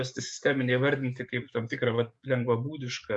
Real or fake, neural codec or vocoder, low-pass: fake; codec, 44.1 kHz, 7.8 kbps, DAC; 10.8 kHz